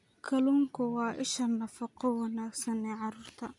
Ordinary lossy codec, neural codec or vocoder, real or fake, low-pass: none; none; real; 10.8 kHz